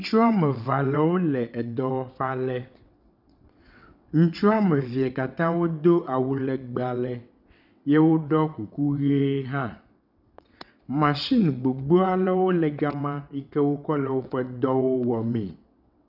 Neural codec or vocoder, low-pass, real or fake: vocoder, 22.05 kHz, 80 mel bands, WaveNeXt; 5.4 kHz; fake